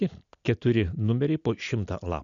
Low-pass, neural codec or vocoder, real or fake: 7.2 kHz; none; real